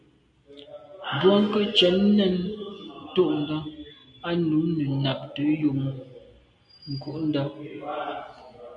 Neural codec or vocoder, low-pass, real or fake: none; 9.9 kHz; real